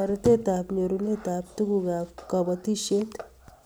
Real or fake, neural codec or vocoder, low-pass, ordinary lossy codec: real; none; none; none